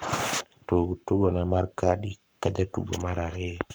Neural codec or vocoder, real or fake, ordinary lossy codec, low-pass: codec, 44.1 kHz, 7.8 kbps, Pupu-Codec; fake; none; none